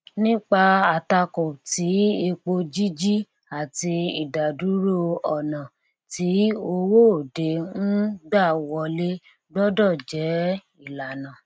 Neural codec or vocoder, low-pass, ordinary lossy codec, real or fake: none; none; none; real